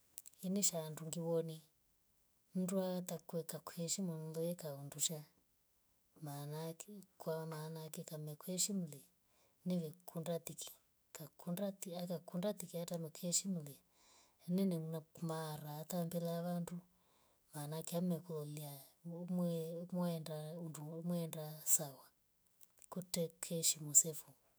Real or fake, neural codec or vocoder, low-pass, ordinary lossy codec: fake; autoencoder, 48 kHz, 128 numbers a frame, DAC-VAE, trained on Japanese speech; none; none